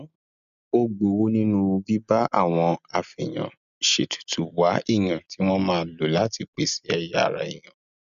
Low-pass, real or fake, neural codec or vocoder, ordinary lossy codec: 7.2 kHz; real; none; none